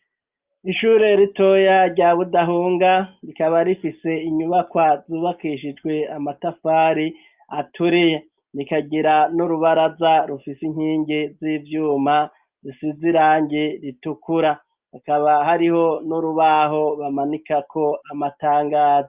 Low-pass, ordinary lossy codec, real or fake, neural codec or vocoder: 3.6 kHz; Opus, 24 kbps; real; none